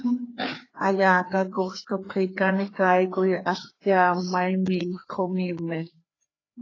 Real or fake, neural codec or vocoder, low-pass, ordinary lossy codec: fake; codec, 16 kHz, 2 kbps, FreqCodec, larger model; 7.2 kHz; AAC, 32 kbps